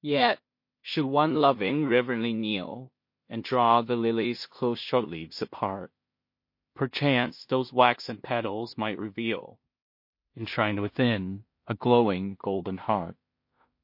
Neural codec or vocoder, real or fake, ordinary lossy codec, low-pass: codec, 16 kHz in and 24 kHz out, 0.4 kbps, LongCat-Audio-Codec, two codebook decoder; fake; MP3, 32 kbps; 5.4 kHz